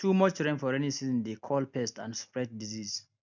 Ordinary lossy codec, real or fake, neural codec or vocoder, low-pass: none; real; none; 7.2 kHz